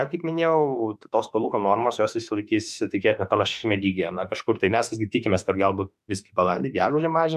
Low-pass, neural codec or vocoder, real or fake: 14.4 kHz; autoencoder, 48 kHz, 32 numbers a frame, DAC-VAE, trained on Japanese speech; fake